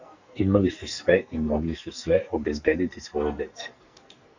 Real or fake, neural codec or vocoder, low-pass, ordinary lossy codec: fake; codec, 44.1 kHz, 2.6 kbps, SNAC; 7.2 kHz; Opus, 64 kbps